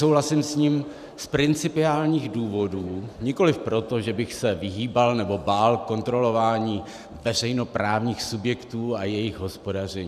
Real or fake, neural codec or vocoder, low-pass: fake; vocoder, 44.1 kHz, 128 mel bands every 512 samples, BigVGAN v2; 14.4 kHz